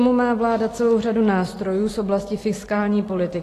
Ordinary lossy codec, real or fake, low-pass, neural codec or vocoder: AAC, 48 kbps; real; 14.4 kHz; none